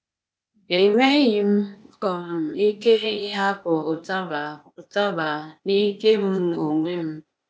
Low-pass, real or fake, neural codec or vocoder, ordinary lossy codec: none; fake; codec, 16 kHz, 0.8 kbps, ZipCodec; none